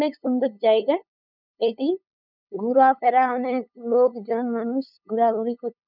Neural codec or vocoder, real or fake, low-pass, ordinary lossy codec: codec, 16 kHz, 8 kbps, FunCodec, trained on LibriTTS, 25 frames a second; fake; 5.4 kHz; none